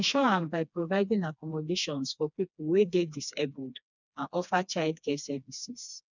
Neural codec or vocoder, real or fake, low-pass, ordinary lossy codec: codec, 16 kHz, 2 kbps, FreqCodec, smaller model; fake; 7.2 kHz; none